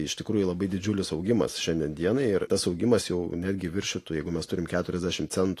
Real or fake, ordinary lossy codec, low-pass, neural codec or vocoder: real; AAC, 48 kbps; 14.4 kHz; none